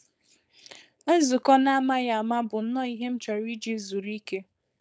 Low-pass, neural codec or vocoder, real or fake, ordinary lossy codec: none; codec, 16 kHz, 4.8 kbps, FACodec; fake; none